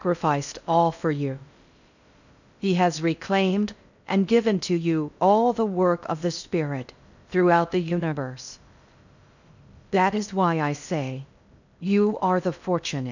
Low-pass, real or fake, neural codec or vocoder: 7.2 kHz; fake; codec, 16 kHz in and 24 kHz out, 0.6 kbps, FocalCodec, streaming, 2048 codes